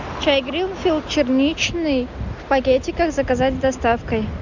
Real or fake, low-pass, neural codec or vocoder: real; 7.2 kHz; none